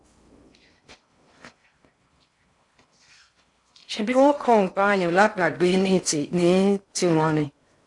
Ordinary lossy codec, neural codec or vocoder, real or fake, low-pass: AAC, 64 kbps; codec, 16 kHz in and 24 kHz out, 0.6 kbps, FocalCodec, streaming, 4096 codes; fake; 10.8 kHz